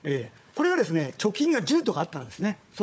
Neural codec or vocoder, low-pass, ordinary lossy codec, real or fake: codec, 16 kHz, 4 kbps, FunCodec, trained on Chinese and English, 50 frames a second; none; none; fake